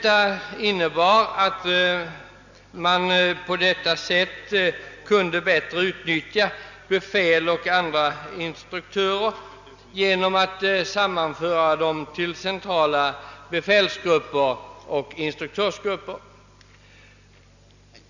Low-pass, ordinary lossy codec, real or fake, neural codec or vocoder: 7.2 kHz; none; real; none